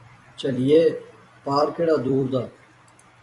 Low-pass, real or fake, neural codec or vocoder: 10.8 kHz; fake; vocoder, 44.1 kHz, 128 mel bands every 256 samples, BigVGAN v2